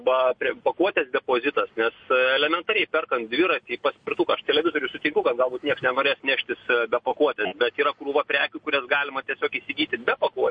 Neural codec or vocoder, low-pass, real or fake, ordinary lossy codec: none; 9.9 kHz; real; MP3, 48 kbps